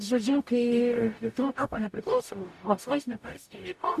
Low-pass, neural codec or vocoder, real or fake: 14.4 kHz; codec, 44.1 kHz, 0.9 kbps, DAC; fake